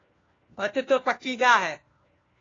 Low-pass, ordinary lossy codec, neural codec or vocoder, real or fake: 7.2 kHz; AAC, 32 kbps; codec, 16 kHz, 1 kbps, FunCodec, trained on LibriTTS, 50 frames a second; fake